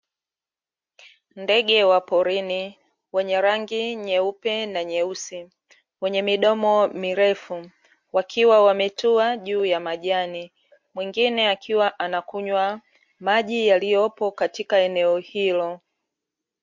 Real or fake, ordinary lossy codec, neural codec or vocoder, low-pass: real; MP3, 48 kbps; none; 7.2 kHz